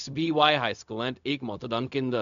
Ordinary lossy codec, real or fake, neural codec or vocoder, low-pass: MP3, 96 kbps; fake; codec, 16 kHz, 0.4 kbps, LongCat-Audio-Codec; 7.2 kHz